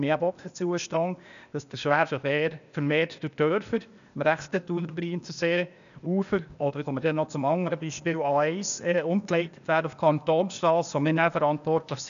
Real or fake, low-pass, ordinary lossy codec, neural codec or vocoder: fake; 7.2 kHz; none; codec, 16 kHz, 0.8 kbps, ZipCodec